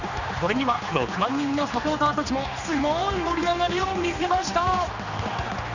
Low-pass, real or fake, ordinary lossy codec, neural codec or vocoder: 7.2 kHz; fake; none; codec, 16 kHz, 2 kbps, X-Codec, HuBERT features, trained on general audio